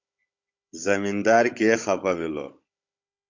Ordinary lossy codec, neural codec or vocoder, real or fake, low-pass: MP3, 64 kbps; codec, 16 kHz, 16 kbps, FunCodec, trained on Chinese and English, 50 frames a second; fake; 7.2 kHz